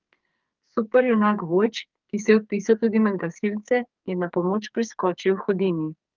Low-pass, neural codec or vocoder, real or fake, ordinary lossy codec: 7.2 kHz; codec, 44.1 kHz, 2.6 kbps, SNAC; fake; Opus, 24 kbps